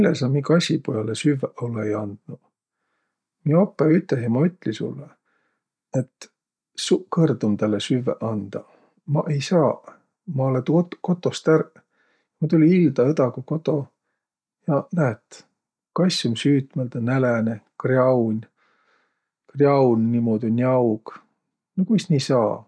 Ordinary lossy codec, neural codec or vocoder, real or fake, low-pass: none; none; real; none